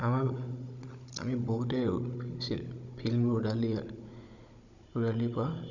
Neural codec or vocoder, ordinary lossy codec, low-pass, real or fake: codec, 16 kHz, 16 kbps, FunCodec, trained on Chinese and English, 50 frames a second; none; 7.2 kHz; fake